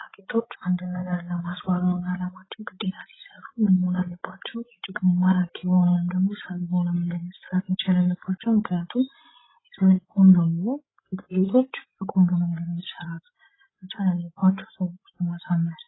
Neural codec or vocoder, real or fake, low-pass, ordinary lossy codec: codec, 16 kHz, 16 kbps, FreqCodec, larger model; fake; 7.2 kHz; AAC, 16 kbps